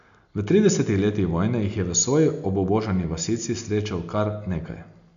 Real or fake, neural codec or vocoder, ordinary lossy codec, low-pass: real; none; none; 7.2 kHz